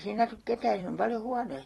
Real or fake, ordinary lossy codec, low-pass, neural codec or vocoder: fake; AAC, 32 kbps; 19.8 kHz; vocoder, 44.1 kHz, 128 mel bands every 256 samples, BigVGAN v2